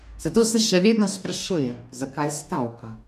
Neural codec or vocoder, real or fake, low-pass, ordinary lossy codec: codec, 44.1 kHz, 2.6 kbps, DAC; fake; 14.4 kHz; none